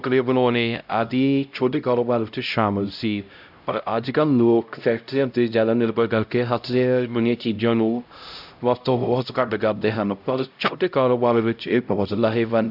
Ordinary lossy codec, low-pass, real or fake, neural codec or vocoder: none; 5.4 kHz; fake; codec, 16 kHz, 0.5 kbps, X-Codec, HuBERT features, trained on LibriSpeech